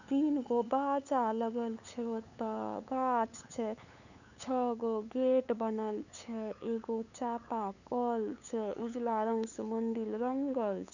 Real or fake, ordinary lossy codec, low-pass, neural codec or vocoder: fake; none; 7.2 kHz; codec, 16 kHz, 8 kbps, FunCodec, trained on LibriTTS, 25 frames a second